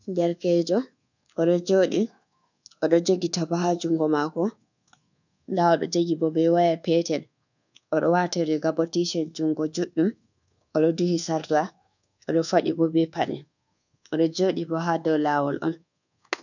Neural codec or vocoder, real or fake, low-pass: codec, 24 kHz, 1.2 kbps, DualCodec; fake; 7.2 kHz